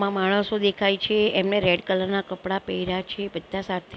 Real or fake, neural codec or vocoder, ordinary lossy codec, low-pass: real; none; none; none